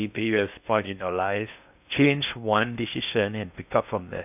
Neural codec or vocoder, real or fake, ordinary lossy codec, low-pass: codec, 16 kHz in and 24 kHz out, 0.6 kbps, FocalCodec, streaming, 2048 codes; fake; none; 3.6 kHz